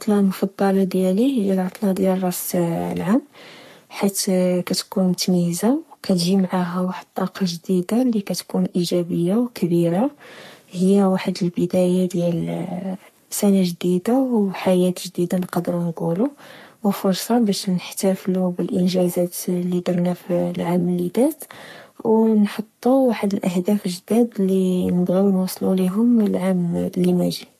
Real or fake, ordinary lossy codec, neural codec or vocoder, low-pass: fake; MP3, 64 kbps; codec, 44.1 kHz, 3.4 kbps, Pupu-Codec; 14.4 kHz